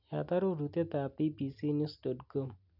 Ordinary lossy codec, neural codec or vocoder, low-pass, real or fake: none; none; 5.4 kHz; real